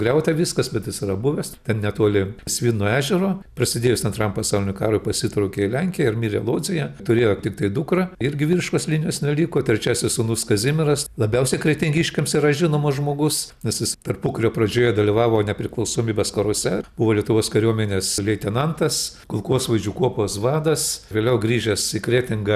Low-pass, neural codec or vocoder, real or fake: 14.4 kHz; none; real